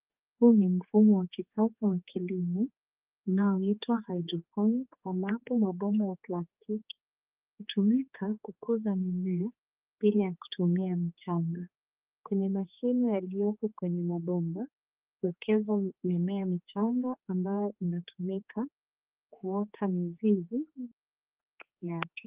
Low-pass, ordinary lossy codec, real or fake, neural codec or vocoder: 3.6 kHz; Opus, 32 kbps; fake; codec, 16 kHz, 4 kbps, X-Codec, HuBERT features, trained on general audio